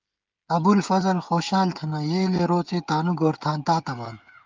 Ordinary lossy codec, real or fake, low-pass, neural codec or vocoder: Opus, 32 kbps; fake; 7.2 kHz; codec, 16 kHz, 16 kbps, FreqCodec, smaller model